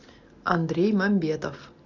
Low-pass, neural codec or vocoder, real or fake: 7.2 kHz; none; real